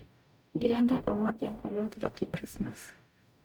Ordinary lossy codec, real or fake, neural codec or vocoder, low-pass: none; fake; codec, 44.1 kHz, 0.9 kbps, DAC; none